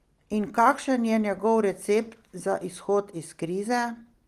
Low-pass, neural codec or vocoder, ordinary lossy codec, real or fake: 14.4 kHz; none; Opus, 24 kbps; real